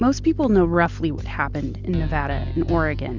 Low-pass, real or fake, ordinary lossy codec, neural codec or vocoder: 7.2 kHz; real; Opus, 64 kbps; none